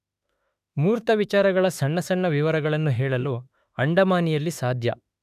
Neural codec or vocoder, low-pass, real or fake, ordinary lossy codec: autoencoder, 48 kHz, 32 numbers a frame, DAC-VAE, trained on Japanese speech; 14.4 kHz; fake; none